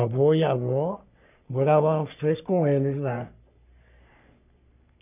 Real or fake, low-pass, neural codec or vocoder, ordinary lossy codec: fake; 3.6 kHz; codec, 44.1 kHz, 3.4 kbps, Pupu-Codec; none